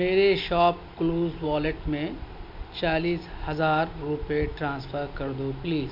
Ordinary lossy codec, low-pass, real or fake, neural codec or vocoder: MP3, 48 kbps; 5.4 kHz; real; none